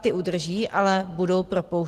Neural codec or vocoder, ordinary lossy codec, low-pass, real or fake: none; Opus, 16 kbps; 14.4 kHz; real